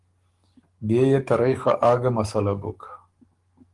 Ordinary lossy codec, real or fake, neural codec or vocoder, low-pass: Opus, 24 kbps; fake; codec, 44.1 kHz, 7.8 kbps, DAC; 10.8 kHz